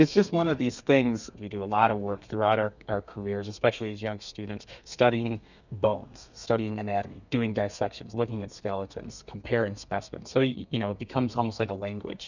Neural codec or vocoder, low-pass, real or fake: codec, 32 kHz, 1.9 kbps, SNAC; 7.2 kHz; fake